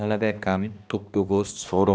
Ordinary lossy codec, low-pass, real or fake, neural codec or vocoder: none; none; fake; codec, 16 kHz, 1 kbps, X-Codec, HuBERT features, trained on balanced general audio